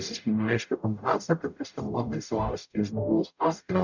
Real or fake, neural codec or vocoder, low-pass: fake; codec, 44.1 kHz, 0.9 kbps, DAC; 7.2 kHz